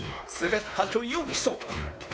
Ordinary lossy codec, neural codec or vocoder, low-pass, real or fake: none; codec, 16 kHz, 2 kbps, X-Codec, WavLM features, trained on Multilingual LibriSpeech; none; fake